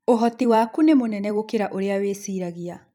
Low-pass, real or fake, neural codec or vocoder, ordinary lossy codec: 19.8 kHz; fake; vocoder, 44.1 kHz, 128 mel bands every 256 samples, BigVGAN v2; none